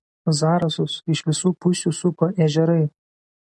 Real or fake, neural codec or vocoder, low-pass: real; none; 10.8 kHz